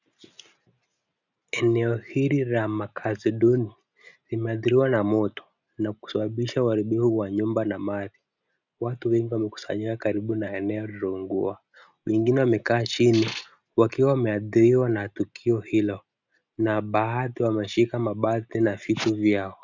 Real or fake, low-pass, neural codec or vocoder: real; 7.2 kHz; none